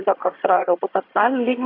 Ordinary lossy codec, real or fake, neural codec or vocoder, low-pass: AAC, 24 kbps; fake; vocoder, 22.05 kHz, 80 mel bands, HiFi-GAN; 5.4 kHz